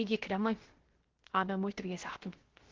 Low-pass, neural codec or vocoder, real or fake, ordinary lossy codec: 7.2 kHz; codec, 16 kHz, 0.3 kbps, FocalCodec; fake; Opus, 16 kbps